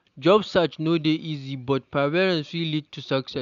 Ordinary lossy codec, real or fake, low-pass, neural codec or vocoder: none; real; 7.2 kHz; none